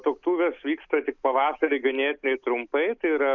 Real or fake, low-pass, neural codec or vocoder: real; 7.2 kHz; none